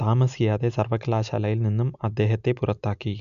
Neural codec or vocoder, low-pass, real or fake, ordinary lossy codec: none; 7.2 kHz; real; none